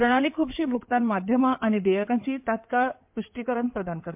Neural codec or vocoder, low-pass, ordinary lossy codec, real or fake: codec, 16 kHz in and 24 kHz out, 2.2 kbps, FireRedTTS-2 codec; 3.6 kHz; MP3, 32 kbps; fake